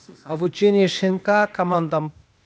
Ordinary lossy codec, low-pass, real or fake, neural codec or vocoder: none; none; fake; codec, 16 kHz, 0.8 kbps, ZipCodec